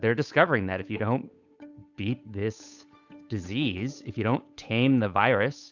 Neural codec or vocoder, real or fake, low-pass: codec, 16 kHz, 8 kbps, FunCodec, trained on Chinese and English, 25 frames a second; fake; 7.2 kHz